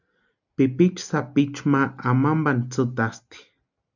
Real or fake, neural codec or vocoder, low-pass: real; none; 7.2 kHz